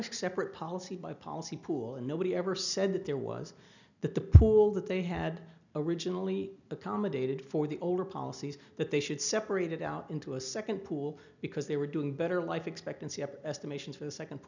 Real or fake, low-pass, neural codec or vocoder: real; 7.2 kHz; none